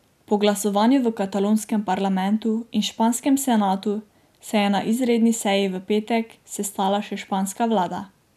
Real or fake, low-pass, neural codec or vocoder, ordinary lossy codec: real; 14.4 kHz; none; none